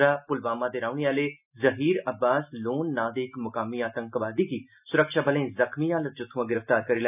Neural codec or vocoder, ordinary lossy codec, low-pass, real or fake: none; none; 3.6 kHz; real